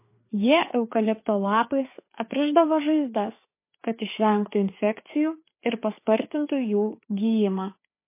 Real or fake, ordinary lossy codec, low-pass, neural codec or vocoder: fake; MP3, 24 kbps; 3.6 kHz; codec, 16 kHz, 4 kbps, FreqCodec, larger model